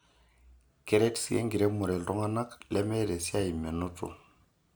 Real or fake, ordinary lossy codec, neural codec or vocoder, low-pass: real; none; none; none